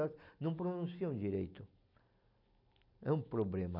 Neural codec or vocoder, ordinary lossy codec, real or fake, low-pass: none; none; real; 5.4 kHz